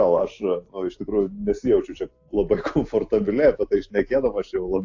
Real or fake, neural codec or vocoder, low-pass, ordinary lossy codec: real; none; 7.2 kHz; AAC, 48 kbps